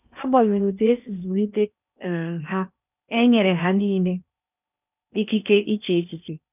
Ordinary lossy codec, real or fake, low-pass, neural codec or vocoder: none; fake; 3.6 kHz; codec, 16 kHz in and 24 kHz out, 0.8 kbps, FocalCodec, streaming, 65536 codes